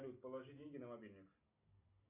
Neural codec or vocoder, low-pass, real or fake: none; 3.6 kHz; real